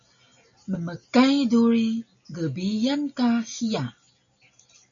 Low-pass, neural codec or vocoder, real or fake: 7.2 kHz; none; real